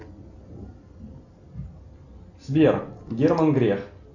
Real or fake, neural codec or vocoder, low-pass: real; none; 7.2 kHz